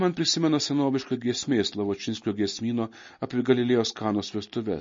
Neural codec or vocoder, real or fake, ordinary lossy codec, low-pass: none; real; MP3, 32 kbps; 7.2 kHz